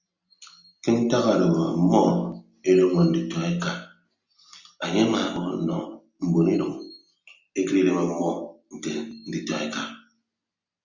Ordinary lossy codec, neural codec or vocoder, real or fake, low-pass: Opus, 64 kbps; none; real; 7.2 kHz